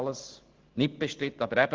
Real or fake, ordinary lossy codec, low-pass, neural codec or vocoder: real; Opus, 16 kbps; 7.2 kHz; none